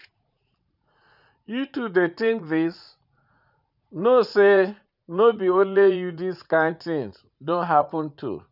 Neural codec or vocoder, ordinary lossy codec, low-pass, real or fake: vocoder, 44.1 kHz, 80 mel bands, Vocos; none; 5.4 kHz; fake